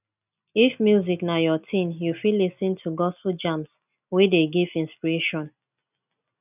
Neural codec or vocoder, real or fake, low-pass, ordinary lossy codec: none; real; 3.6 kHz; none